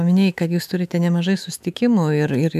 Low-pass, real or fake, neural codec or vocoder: 14.4 kHz; fake; autoencoder, 48 kHz, 128 numbers a frame, DAC-VAE, trained on Japanese speech